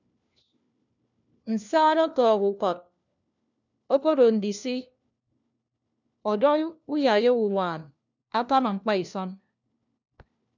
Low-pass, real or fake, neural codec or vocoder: 7.2 kHz; fake; codec, 16 kHz, 1 kbps, FunCodec, trained on LibriTTS, 50 frames a second